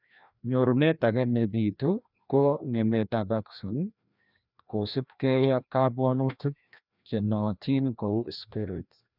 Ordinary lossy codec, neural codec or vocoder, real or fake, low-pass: none; codec, 16 kHz, 1 kbps, FreqCodec, larger model; fake; 5.4 kHz